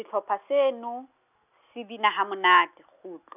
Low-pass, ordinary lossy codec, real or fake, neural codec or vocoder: 3.6 kHz; none; real; none